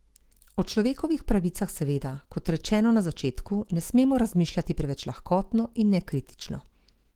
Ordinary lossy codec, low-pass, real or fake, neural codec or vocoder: Opus, 16 kbps; 19.8 kHz; fake; autoencoder, 48 kHz, 128 numbers a frame, DAC-VAE, trained on Japanese speech